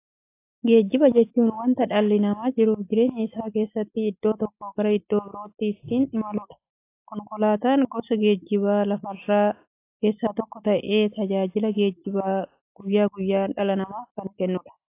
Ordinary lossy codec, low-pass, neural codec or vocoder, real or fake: AAC, 24 kbps; 3.6 kHz; none; real